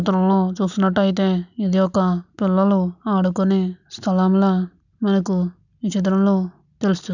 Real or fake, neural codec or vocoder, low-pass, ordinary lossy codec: real; none; 7.2 kHz; none